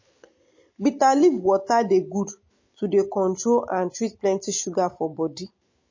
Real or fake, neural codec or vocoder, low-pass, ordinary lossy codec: real; none; 7.2 kHz; MP3, 32 kbps